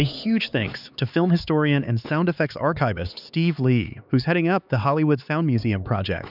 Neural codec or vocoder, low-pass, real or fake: codec, 16 kHz, 4 kbps, X-Codec, HuBERT features, trained on LibriSpeech; 5.4 kHz; fake